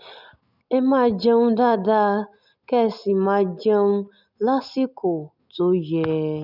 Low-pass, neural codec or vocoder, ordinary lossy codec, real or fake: 5.4 kHz; none; none; real